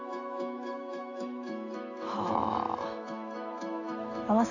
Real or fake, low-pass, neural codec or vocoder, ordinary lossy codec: fake; 7.2 kHz; autoencoder, 48 kHz, 128 numbers a frame, DAC-VAE, trained on Japanese speech; none